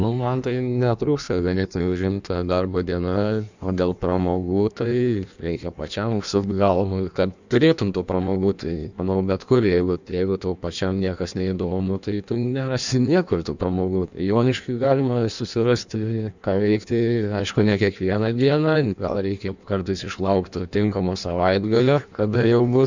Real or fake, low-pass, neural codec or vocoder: fake; 7.2 kHz; codec, 16 kHz in and 24 kHz out, 1.1 kbps, FireRedTTS-2 codec